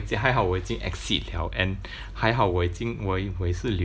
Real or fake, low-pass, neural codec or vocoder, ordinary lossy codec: real; none; none; none